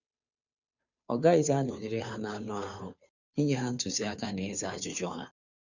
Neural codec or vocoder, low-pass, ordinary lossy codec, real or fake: codec, 16 kHz, 2 kbps, FunCodec, trained on Chinese and English, 25 frames a second; 7.2 kHz; none; fake